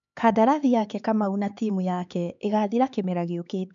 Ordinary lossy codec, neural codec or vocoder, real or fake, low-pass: MP3, 96 kbps; codec, 16 kHz, 4 kbps, X-Codec, HuBERT features, trained on LibriSpeech; fake; 7.2 kHz